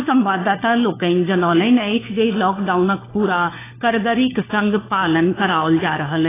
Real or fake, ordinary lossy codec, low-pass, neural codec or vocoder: fake; AAC, 16 kbps; 3.6 kHz; codec, 16 kHz, 4 kbps, FunCodec, trained on LibriTTS, 50 frames a second